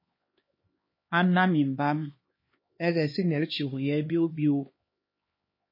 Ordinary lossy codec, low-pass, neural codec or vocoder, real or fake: MP3, 24 kbps; 5.4 kHz; codec, 16 kHz, 2 kbps, X-Codec, HuBERT features, trained on LibriSpeech; fake